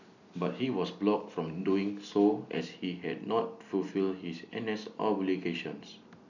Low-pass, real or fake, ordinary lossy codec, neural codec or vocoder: 7.2 kHz; real; none; none